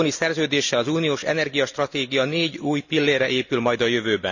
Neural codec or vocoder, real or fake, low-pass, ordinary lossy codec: none; real; 7.2 kHz; none